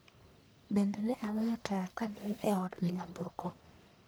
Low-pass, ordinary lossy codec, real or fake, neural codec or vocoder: none; none; fake; codec, 44.1 kHz, 1.7 kbps, Pupu-Codec